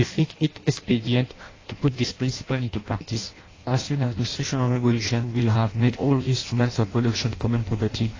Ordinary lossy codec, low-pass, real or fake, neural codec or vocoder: AAC, 32 kbps; 7.2 kHz; fake; codec, 16 kHz in and 24 kHz out, 0.6 kbps, FireRedTTS-2 codec